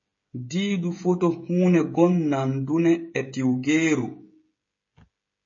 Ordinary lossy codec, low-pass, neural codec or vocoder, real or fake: MP3, 32 kbps; 7.2 kHz; codec, 16 kHz, 16 kbps, FreqCodec, smaller model; fake